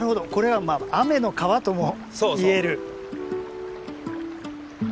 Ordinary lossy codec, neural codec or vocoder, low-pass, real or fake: none; none; none; real